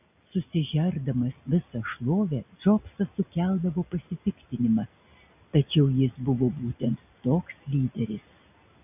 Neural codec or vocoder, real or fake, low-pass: none; real; 3.6 kHz